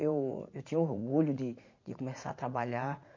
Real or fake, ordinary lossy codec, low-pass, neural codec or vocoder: fake; MP3, 48 kbps; 7.2 kHz; vocoder, 44.1 kHz, 80 mel bands, Vocos